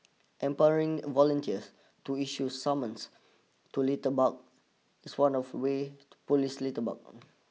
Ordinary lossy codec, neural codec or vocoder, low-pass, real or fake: none; none; none; real